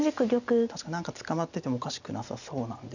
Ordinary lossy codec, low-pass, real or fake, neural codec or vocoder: none; 7.2 kHz; real; none